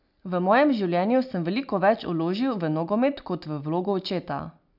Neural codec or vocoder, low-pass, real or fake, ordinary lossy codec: none; 5.4 kHz; real; none